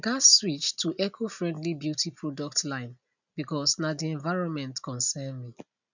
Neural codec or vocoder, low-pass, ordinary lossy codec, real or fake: none; 7.2 kHz; none; real